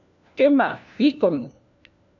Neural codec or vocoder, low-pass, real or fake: codec, 16 kHz, 1 kbps, FunCodec, trained on LibriTTS, 50 frames a second; 7.2 kHz; fake